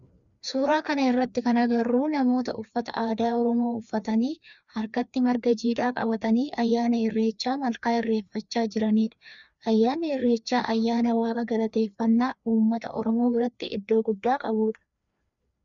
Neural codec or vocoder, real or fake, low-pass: codec, 16 kHz, 2 kbps, FreqCodec, larger model; fake; 7.2 kHz